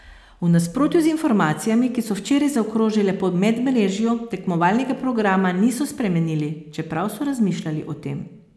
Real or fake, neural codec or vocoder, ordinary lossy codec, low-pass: real; none; none; none